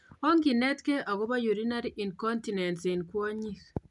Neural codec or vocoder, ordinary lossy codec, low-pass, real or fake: none; none; 10.8 kHz; real